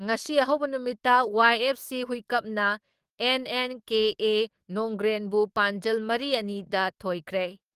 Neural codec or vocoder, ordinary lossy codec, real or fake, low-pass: codec, 44.1 kHz, 7.8 kbps, DAC; Opus, 24 kbps; fake; 14.4 kHz